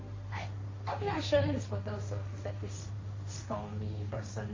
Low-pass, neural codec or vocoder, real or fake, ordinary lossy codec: 7.2 kHz; codec, 16 kHz, 1.1 kbps, Voila-Tokenizer; fake; MP3, 32 kbps